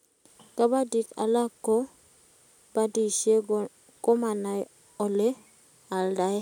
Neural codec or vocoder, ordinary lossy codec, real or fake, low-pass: none; none; real; 19.8 kHz